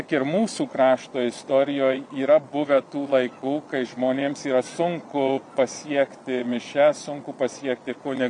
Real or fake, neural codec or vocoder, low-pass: fake; vocoder, 22.05 kHz, 80 mel bands, Vocos; 9.9 kHz